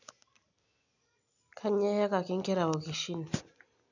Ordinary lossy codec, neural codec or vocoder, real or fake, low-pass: none; none; real; 7.2 kHz